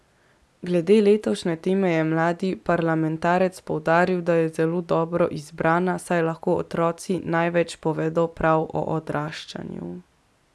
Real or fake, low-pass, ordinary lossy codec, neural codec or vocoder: real; none; none; none